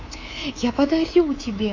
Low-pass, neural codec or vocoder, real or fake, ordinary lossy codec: 7.2 kHz; codec, 24 kHz, 3.1 kbps, DualCodec; fake; AAC, 32 kbps